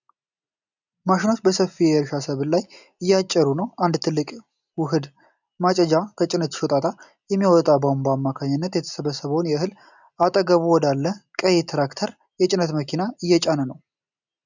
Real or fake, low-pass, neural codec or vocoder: real; 7.2 kHz; none